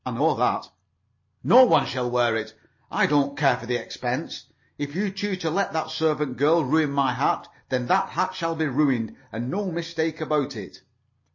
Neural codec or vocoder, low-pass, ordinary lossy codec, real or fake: none; 7.2 kHz; MP3, 32 kbps; real